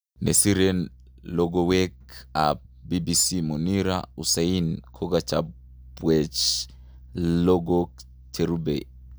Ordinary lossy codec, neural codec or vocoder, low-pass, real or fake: none; none; none; real